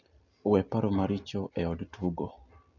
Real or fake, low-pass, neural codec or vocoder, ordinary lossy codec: real; 7.2 kHz; none; none